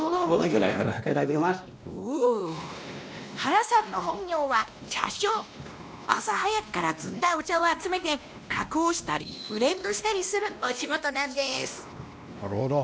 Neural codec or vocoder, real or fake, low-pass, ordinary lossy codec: codec, 16 kHz, 1 kbps, X-Codec, WavLM features, trained on Multilingual LibriSpeech; fake; none; none